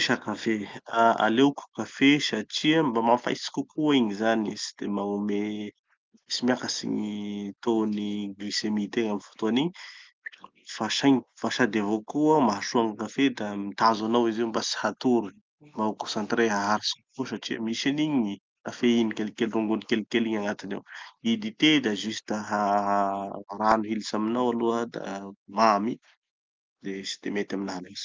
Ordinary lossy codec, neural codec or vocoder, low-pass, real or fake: Opus, 24 kbps; none; 7.2 kHz; real